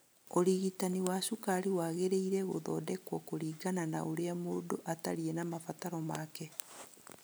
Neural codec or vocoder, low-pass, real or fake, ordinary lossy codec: none; none; real; none